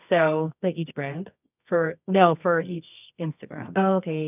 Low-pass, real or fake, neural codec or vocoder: 3.6 kHz; fake; codec, 24 kHz, 0.9 kbps, WavTokenizer, medium music audio release